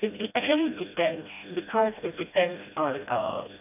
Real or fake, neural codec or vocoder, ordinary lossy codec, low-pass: fake; codec, 16 kHz, 1 kbps, FreqCodec, smaller model; none; 3.6 kHz